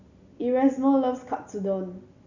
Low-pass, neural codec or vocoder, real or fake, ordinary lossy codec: 7.2 kHz; none; real; none